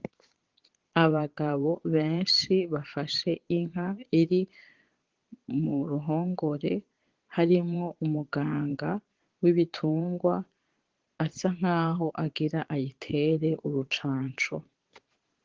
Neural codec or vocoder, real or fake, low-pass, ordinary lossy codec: vocoder, 22.05 kHz, 80 mel bands, Vocos; fake; 7.2 kHz; Opus, 16 kbps